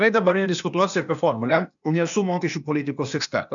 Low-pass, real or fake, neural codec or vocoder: 7.2 kHz; fake; codec, 16 kHz, 0.8 kbps, ZipCodec